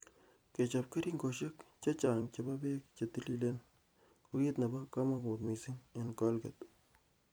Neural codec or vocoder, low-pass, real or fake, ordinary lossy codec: vocoder, 44.1 kHz, 128 mel bands every 256 samples, BigVGAN v2; none; fake; none